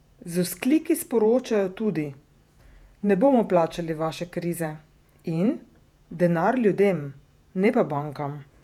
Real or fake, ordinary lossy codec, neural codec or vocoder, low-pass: fake; none; vocoder, 48 kHz, 128 mel bands, Vocos; 19.8 kHz